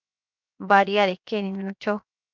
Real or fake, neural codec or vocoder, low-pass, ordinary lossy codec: fake; codec, 16 kHz, 0.7 kbps, FocalCodec; 7.2 kHz; MP3, 64 kbps